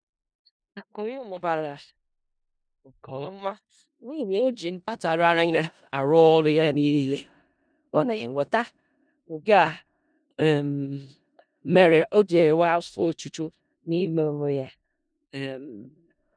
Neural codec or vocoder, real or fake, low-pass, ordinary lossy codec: codec, 16 kHz in and 24 kHz out, 0.4 kbps, LongCat-Audio-Codec, four codebook decoder; fake; 9.9 kHz; none